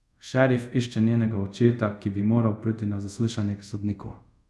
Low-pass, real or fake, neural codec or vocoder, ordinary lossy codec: none; fake; codec, 24 kHz, 0.5 kbps, DualCodec; none